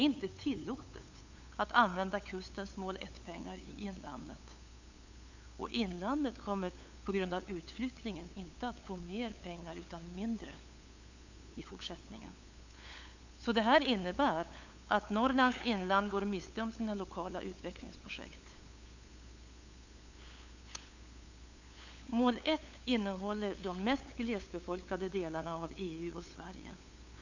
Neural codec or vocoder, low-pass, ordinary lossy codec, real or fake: codec, 16 kHz, 8 kbps, FunCodec, trained on LibriTTS, 25 frames a second; 7.2 kHz; Opus, 64 kbps; fake